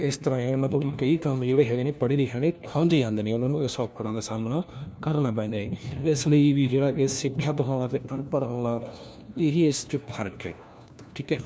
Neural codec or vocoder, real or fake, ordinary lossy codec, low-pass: codec, 16 kHz, 1 kbps, FunCodec, trained on LibriTTS, 50 frames a second; fake; none; none